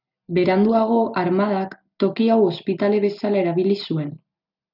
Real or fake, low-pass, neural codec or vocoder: real; 5.4 kHz; none